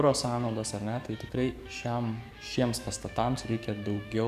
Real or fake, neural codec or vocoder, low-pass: fake; codec, 44.1 kHz, 7.8 kbps, DAC; 14.4 kHz